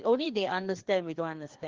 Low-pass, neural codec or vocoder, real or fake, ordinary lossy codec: 7.2 kHz; codec, 16 kHz, 2 kbps, FreqCodec, larger model; fake; Opus, 16 kbps